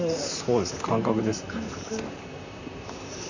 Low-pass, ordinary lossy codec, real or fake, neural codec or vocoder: 7.2 kHz; none; fake; vocoder, 44.1 kHz, 128 mel bands, Pupu-Vocoder